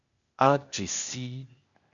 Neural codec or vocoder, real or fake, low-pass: codec, 16 kHz, 0.8 kbps, ZipCodec; fake; 7.2 kHz